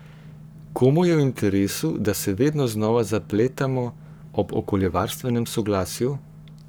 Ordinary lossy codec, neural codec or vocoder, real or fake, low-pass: none; codec, 44.1 kHz, 7.8 kbps, Pupu-Codec; fake; none